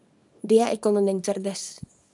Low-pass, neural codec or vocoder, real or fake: 10.8 kHz; codec, 24 kHz, 0.9 kbps, WavTokenizer, small release; fake